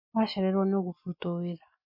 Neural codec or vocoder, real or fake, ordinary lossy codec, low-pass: none; real; MP3, 32 kbps; 5.4 kHz